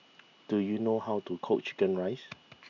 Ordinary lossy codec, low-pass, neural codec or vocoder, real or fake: none; 7.2 kHz; none; real